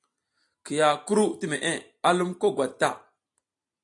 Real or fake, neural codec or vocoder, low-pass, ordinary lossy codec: real; none; 10.8 kHz; AAC, 64 kbps